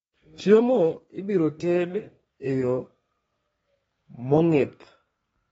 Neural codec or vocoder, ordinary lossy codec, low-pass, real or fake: codec, 32 kHz, 1.9 kbps, SNAC; AAC, 24 kbps; 14.4 kHz; fake